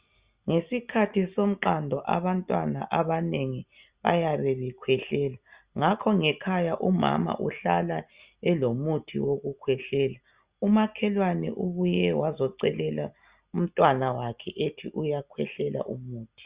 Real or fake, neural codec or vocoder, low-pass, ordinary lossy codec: real; none; 3.6 kHz; Opus, 64 kbps